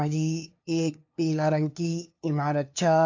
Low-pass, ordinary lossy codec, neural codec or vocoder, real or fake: 7.2 kHz; AAC, 48 kbps; codec, 16 kHz, 2 kbps, FunCodec, trained on LibriTTS, 25 frames a second; fake